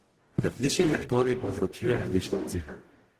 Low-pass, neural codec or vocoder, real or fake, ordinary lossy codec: 14.4 kHz; codec, 44.1 kHz, 0.9 kbps, DAC; fake; Opus, 16 kbps